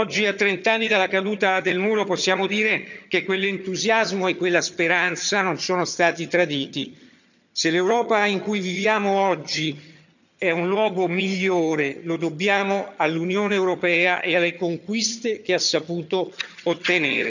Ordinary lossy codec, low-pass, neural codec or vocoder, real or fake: none; 7.2 kHz; vocoder, 22.05 kHz, 80 mel bands, HiFi-GAN; fake